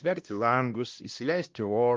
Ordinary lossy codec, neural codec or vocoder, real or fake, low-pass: Opus, 24 kbps; codec, 16 kHz, 1 kbps, X-Codec, WavLM features, trained on Multilingual LibriSpeech; fake; 7.2 kHz